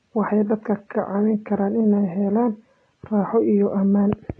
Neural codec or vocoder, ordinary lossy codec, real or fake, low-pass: none; AAC, 32 kbps; real; 9.9 kHz